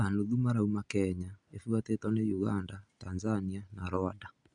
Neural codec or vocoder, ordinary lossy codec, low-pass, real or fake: none; MP3, 96 kbps; 9.9 kHz; real